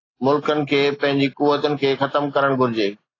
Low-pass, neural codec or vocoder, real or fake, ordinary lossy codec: 7.2 kHz; none; real; AAC, 32 kbps